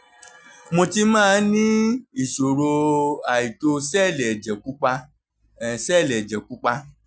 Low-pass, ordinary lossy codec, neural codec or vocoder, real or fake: none; none; none; real